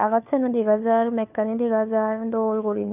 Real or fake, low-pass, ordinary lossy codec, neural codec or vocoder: fake; 3.6 kHz; none; codec, 16 kHz, 4 kbps, FunCodec, trained on LibriTTS, 50 frames a second